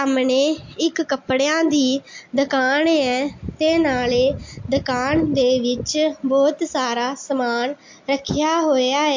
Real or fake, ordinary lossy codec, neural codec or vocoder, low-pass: real; MP3, 48 kbps; none; 7.2 kHz